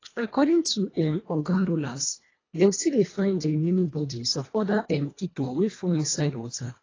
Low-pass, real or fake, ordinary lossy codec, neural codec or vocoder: 7.2 kHz; fake; AAC, 32 kbps; codec, 24 kHz, 1.5 kbps, HILCodec